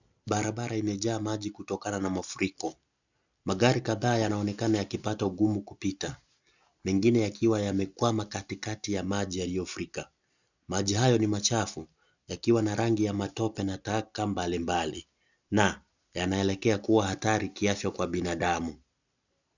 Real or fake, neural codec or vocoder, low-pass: real; none; 7.2 kHz